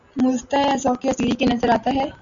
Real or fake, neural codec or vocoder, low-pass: real; none; 7.2 kHz